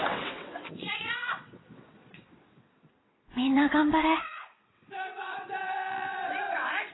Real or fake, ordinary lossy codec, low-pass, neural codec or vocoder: fake; AAC, 16 kbps; 7.2 kHz; vocoder, 22.05 kHz, 80 mel bands, WaveNeXt